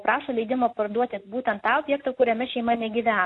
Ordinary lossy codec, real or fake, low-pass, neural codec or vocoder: AAC, 48 kbps; real; 10.8 kHz; none